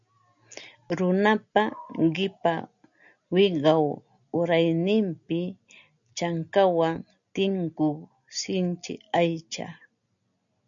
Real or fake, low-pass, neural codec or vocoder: real; 7.2 kHz; none